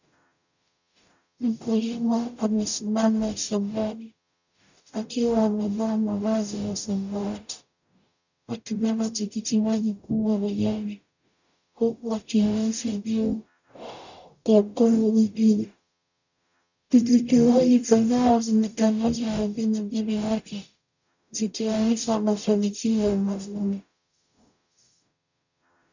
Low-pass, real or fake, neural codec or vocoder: 7.2 kHz; fake; codec, 44.1 kHz, 0.9 kbps, DAC